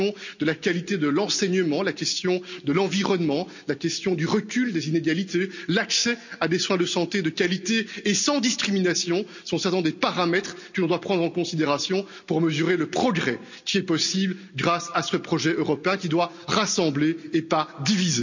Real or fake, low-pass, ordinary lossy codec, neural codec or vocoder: real; 7.2 kHz; none; none